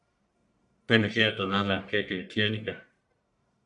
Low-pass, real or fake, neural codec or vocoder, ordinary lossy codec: 10.8 kHz; fake; codec, 44.1 kHz, 1.7 kbps, Pupu-Codec; MP3, 96 kbps